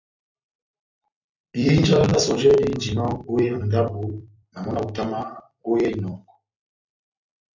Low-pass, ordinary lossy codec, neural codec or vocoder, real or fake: 7.2 kHz; MP3, 64 kbps; vocoder, 44.1 kHz, 128 mel bands every 256 samples, BigVGAN v2; fake